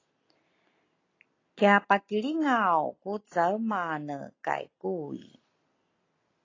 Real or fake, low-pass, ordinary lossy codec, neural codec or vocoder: real; 7.2 kHz; AAC, 32 kbps; none